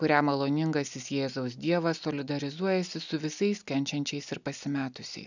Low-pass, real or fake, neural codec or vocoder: 7.2 kHz; real; none